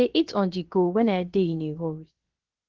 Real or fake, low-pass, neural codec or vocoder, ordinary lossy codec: fake; 7.2 kHz; codec, 16 kHz, about 1 kbps, DyCAST, with the encoder's durations; Opus, 16 kbps